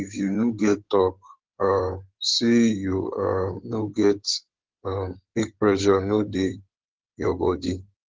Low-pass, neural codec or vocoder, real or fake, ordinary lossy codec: 7.2 kHz; codec, 16 kHz in and 24 kHz out, 2.2 kbps, FireRedTTS-2 codec; fake; Opus, 16 kbps